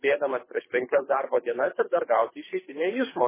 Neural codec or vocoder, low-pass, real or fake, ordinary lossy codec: codec, 24 kHz, 3 kbps, HILCodec; 3.6 kHz; fake; MP3, 16 kbps